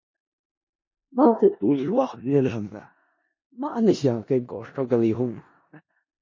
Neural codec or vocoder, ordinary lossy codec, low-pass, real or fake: codec, 16 kHz in and 24 kHz out, 0.4 kbps, LongCat-Audio-Codec, four codebook decoder; MP3, 32 kbps; 7.2 kHz; fake